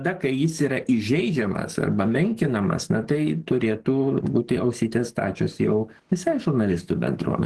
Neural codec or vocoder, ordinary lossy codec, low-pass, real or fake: codec, 44.1 kHz, 7.8 kbps, DAC; Opus, 16 kbps; 10.8 kHz; fake